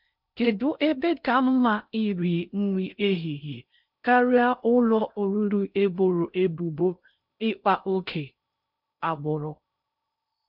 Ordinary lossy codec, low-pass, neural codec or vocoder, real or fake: none; 5.4 kHz; codec, 16 kHz in and 24 kHz out, 0.6 kbps, FocalCodec, streaming, 4096 codes; fake